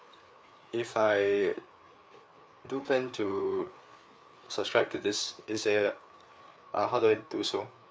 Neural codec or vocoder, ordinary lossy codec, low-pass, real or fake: codec, 16 kHz, 4 kbps, FreqCodec, larger model; none; none; fake